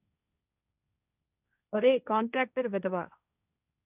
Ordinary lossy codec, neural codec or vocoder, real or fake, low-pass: none; codec, 16 kHz, 1.1 kbps, Voila-Tokenizer; fake; 3.6 kHz